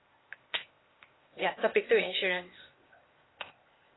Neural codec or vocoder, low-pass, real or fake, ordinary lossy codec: codec, 16 kHz, 0.8 kbps, ZipCodec; 7.2 kHz; fake; AAC, 16 kbps